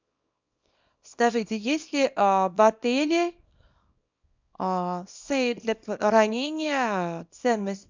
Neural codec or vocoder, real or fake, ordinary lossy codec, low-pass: codec, 24 kHz, 0.9 kbps, WavTokenizer, small release; fake; MP3, 64 kbps; 7.2 kHz